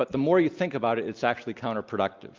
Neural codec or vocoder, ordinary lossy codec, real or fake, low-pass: none; Opus, 24 kbps; real; 7.2 kHz